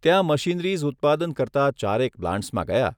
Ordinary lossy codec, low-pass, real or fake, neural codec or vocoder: none; 19.8 kHz; real; none